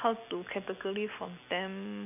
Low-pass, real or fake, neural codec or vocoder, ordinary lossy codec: 3.6 kHz; real; none; none